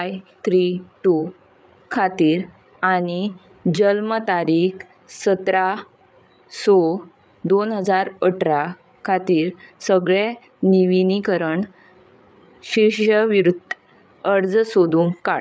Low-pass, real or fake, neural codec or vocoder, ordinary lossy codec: none; fake; codec, 16 kHz, 8 kbps, FreqCodec, larger model; none